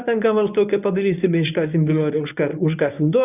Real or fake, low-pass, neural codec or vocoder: fake; 3.6 kHz; codec, 24 kHz, 0.9 kbps, WavTokenizer, medium speech release version 1